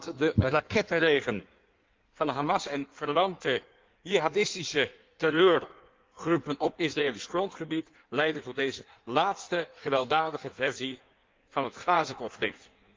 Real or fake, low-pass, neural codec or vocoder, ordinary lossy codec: fake; 7.2 kHz; codec, 16 kHz in and 24 kHz out, 1.1 kbps, FireRedTTS-2 codec; Opus, 24 kbps